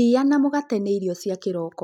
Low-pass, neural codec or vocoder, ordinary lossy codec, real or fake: 19.8 kHz; none; none; real